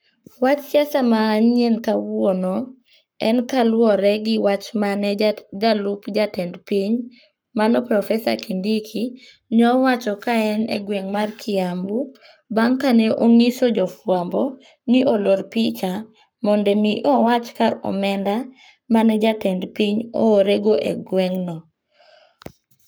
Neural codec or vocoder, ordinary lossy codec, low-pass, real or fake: codec, 44.1 kHz, 7.8 kbps, Pupu-Codec; none; none; fake